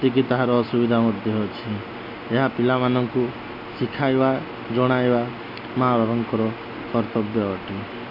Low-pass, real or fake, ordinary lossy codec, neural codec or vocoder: 5.4 kHz; real; none; none